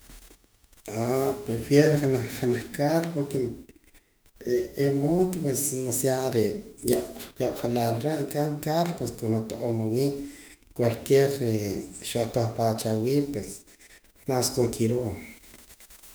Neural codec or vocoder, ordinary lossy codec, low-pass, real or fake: autoencoder, 48 kHz, 32 numbers a frame, DAC-VAE, trained on Japanese speech; none; none; fake